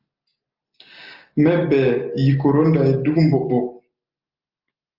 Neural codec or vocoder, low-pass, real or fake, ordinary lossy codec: none; 5.4 kHz; real; Opus, 24 kbps